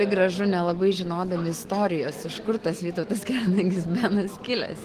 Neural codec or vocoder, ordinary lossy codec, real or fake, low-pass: autoencoder, 48 kHz, 128 numbers a frame, DAC-VAE, trained on Japanese speech; Opus, 16 kbps; fake; 14.4 kHz